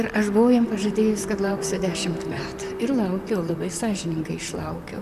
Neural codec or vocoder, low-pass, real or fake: vocoder, 44.1 kHz, 128 mel bands, Pupu-Vocoder; 14.4 kHz; fake